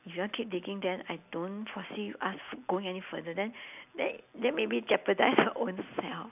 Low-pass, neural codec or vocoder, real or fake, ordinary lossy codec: 3.6 kHz; none; real; none